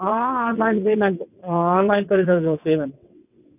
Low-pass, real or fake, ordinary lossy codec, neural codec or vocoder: 3.6 kHz; fake; none; vocoder, 22.05 kHz, 80 mel bands, WaveNeXt